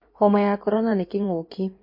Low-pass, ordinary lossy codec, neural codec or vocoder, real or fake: 5.4 kHz; MP3, 32 kbps; codec, 16 kHz in and 24 kHz out, 2.2 kbps, FireRedTTS-2 codec; fake